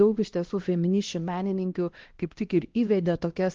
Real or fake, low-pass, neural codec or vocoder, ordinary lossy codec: fake; 7.2 kHz; codec, 16 kHz, 1 kbps, X-Codec, HuBERT features, trained on LibriSpeech; Opus, 16 kbps